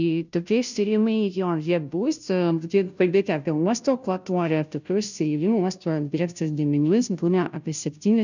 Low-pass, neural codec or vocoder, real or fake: 7.2 kHz; codec, 16 kHz, 0.5 kbps, FunCodec, trained on Chinese and English, 25 frames a second; fake